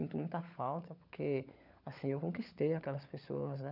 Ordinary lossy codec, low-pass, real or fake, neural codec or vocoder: none; 5.4 kHz; fake; codec, 16 kHz, 16 kbps, FunCodec, trained on LibriTTS, 50 frames a second